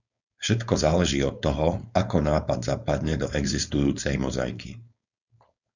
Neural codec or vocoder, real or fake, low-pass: codec, 16 kHz, 4.8 kbps, FACodec; fake; 7.2 kHz